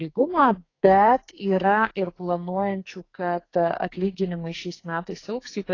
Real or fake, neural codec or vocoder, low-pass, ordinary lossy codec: fake; codec, 44.1 kHz, 2.6 kbps, SNAC; 7.2 kHz; AAC, 32 kbps